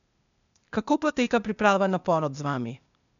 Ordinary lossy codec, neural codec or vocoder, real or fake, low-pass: none; codec, 16 kHz, 0.8 kbps, ZipCodec; fake; 7.2 kHz